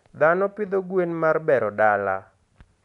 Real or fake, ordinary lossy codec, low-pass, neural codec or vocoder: real; none; 10.8 kHz; none